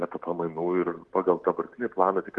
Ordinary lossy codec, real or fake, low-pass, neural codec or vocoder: Opus, 16 kbps; real; 10.8 kHz; none